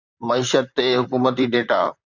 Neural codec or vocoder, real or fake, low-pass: vocoder, 44.1 kHz, 128 mel bands, Pupu-Vocoder; fake; 7.2 kHz